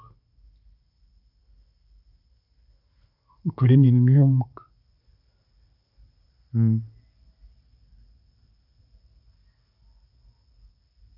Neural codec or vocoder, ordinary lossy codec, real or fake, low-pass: none; none; real; 5.4 kHz